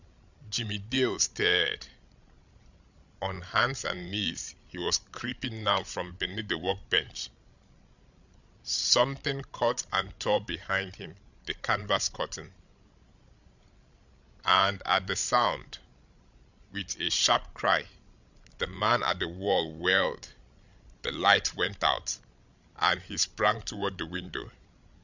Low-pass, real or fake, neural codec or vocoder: 7.2 kHz; fake; codec, 16 kHz, 16 kbps, FreqCodec, larger model